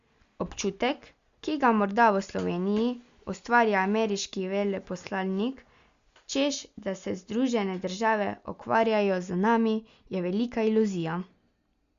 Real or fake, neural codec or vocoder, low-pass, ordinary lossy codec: real; none; 7.2 kHz; Opus, 64 kbps